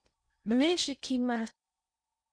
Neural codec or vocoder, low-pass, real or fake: codec, 16 kHz in and 24 kHz out, 0.6 kbps, FocalCodec, streaming, 2048 codes; 9.9 kHz; fake